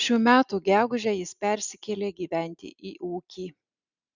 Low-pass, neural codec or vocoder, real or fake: 7.2 kHz; none; real